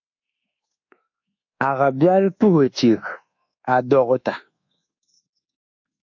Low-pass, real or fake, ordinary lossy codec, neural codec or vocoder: 7.2 kHz; fake; AAC, 48 kbps; codec, 24 kHz, 1.2 kbps, DualCodec